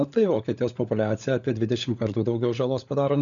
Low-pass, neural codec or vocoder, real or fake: 7.2 kHz; codec, 16 kHz, 16 kbps, FunCodec, trained on LibriTTS, 50 frames a second; fake